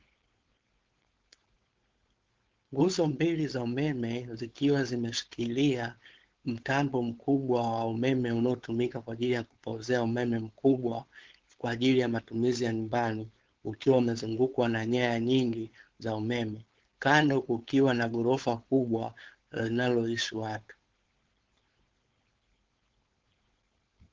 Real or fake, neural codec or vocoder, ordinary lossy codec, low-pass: fake; codec, 16 kHz, 4.8 kbps, FACodec; Opus, 16 kbps; 7.2 kHz